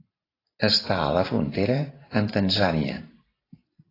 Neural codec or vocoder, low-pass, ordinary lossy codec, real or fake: vocoder, 44.1 kHz, 128 mel bands every 512 samples, BigVGAN v2; 5.4 kHz; AAC, 24 kbps; fake